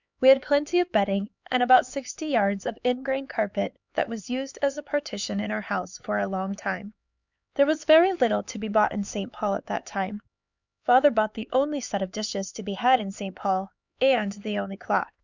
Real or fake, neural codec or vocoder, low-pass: fake; codec, 16 kHz, 4 kbps, X-Codec, HuBERT features, trained on LibriSpeech; 7.2 kHz